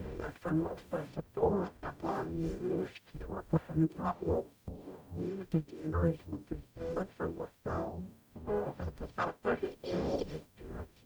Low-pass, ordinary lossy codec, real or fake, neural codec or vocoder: none; none; fake; codec, 44.1 kHz, 0.9 kbps, DAC